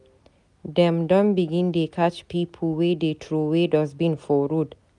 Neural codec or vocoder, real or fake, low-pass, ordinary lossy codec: none; real; 10.8 kHz; none